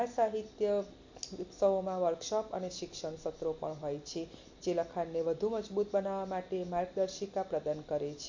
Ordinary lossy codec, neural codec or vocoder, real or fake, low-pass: MP3, 48 kbps; none; real; 7.2 kHz